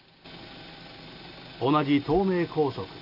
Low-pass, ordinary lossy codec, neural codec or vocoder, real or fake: 5.4 kHz; AAC, 32 kbps; none; real